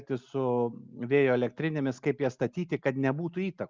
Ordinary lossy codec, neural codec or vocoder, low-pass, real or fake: Opus, 32 kbps; none; 7.2 kHz; real